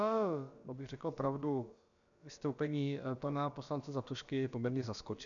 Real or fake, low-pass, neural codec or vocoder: fake; 7.2 kHz; codec, 16 kHz, about 1 kbps, DyCAST, with the encoder's durations